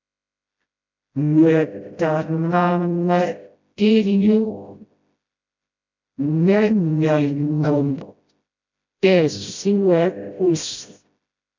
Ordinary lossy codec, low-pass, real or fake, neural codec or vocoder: MP3, 64 kbps; 7.2 kHz; fake; codec, 16 kHz, 0.5 kbps, FreqCodec, smaller model